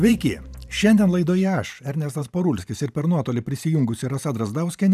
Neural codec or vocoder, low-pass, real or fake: vocoder, 44.1 kHz, 128 mel bands every 512 samples, BigVGAN v2; 14.4 kHz; fake